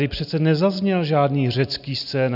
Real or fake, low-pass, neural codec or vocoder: real; 5.4 kHz; none